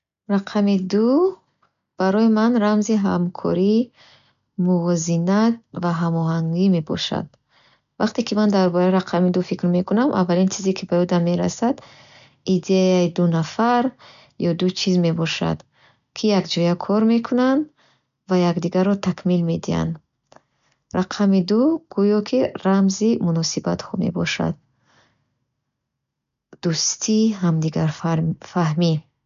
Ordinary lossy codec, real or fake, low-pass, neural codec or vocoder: none; real; 7.2 kHz; none